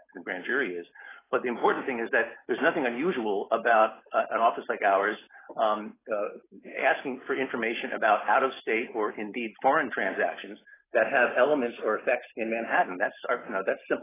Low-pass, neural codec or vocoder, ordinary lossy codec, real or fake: 3.6 kHz; none; AAC, 16 kbps; real